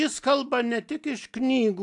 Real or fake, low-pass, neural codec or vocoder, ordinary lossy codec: real; 10.8 kHz; none; AAC, 64 kbps